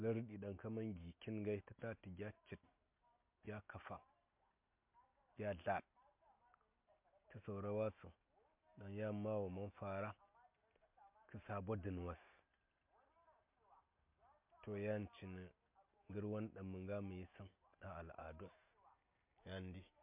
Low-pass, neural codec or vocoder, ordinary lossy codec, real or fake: 3.6 kHz; none; MP3, 32 kbps; real